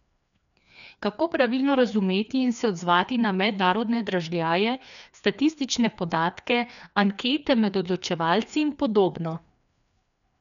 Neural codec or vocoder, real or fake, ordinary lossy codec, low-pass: codec, 16 kHz, 2 kbps, FreqCodec, larger model; fake; none; 7.2 kHz